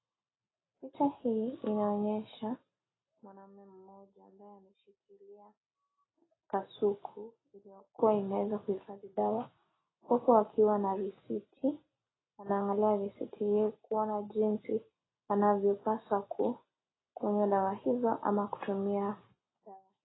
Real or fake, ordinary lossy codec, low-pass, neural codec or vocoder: real; AAC, 16 kbps; 7.2 kHz; none